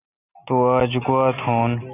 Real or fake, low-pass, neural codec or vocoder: real; 3.6 kHz; none